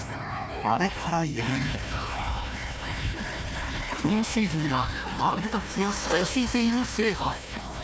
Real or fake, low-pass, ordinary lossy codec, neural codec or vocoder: fake; none; none; codec, 16 kHz, 1 kbps, FunCodec, trained on Chinese and English, 50 frames a second